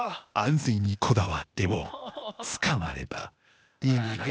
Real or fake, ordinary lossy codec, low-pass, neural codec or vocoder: fake; none; none; codec, 16 kHz, 0.8 kbps, ZipCodec